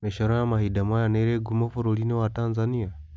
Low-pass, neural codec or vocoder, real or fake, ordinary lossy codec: none; none; real; none